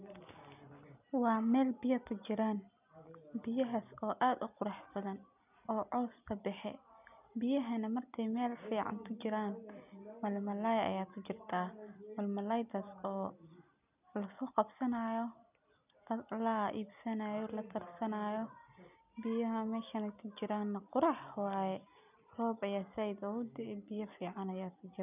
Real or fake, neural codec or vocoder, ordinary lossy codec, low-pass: real; none; none; 3.6 kHz